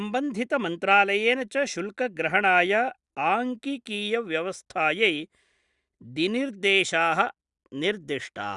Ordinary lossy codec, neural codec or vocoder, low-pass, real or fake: Opus, 64 kbps; none; 10.8 kHz; real